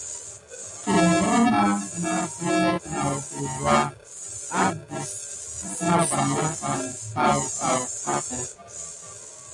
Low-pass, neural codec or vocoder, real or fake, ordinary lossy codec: 10.8 kHz; none; real; AAC, 64 kbps